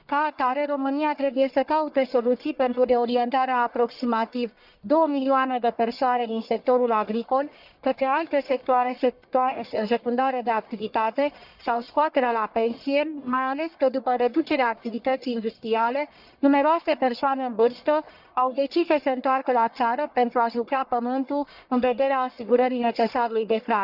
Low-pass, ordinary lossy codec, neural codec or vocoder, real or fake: 5.4 kHz; Opus, 64 kbps; codec, 44.1 kHz, 1.7 kbps, Pupu-Codec; fake